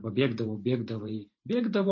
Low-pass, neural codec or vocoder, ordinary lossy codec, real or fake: 7.2 kHz; vocoder, 44.1 kHz, 128 mel bands every 512 samples, BigVGAN v2; MP3, 32 kbps; fake